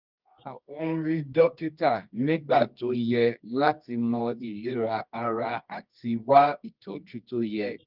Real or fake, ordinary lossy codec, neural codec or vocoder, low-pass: fake; Opus, 32 kbps; codec, 24 kHz, 0.9 kbps, WavTokenizer, medium music audio release; 5.4 kHz